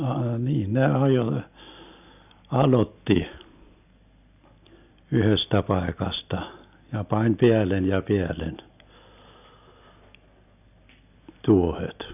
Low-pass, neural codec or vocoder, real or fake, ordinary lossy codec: 3.6 kHz; none; real; none